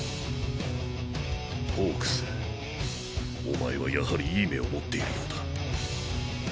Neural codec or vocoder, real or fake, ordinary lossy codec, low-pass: none; real; none; none